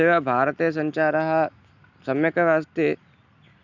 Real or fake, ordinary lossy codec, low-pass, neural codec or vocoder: real; none; 7.2 kHz; none